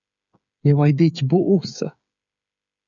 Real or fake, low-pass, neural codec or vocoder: fake; 7.2 kHz; codec, 16 kHz, 8 kbps, FreqCodec, smaller model